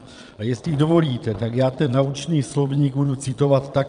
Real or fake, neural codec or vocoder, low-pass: fake; vocoder, 22.05 kHz, 80 mel bands, Vocos; 9.9 kHz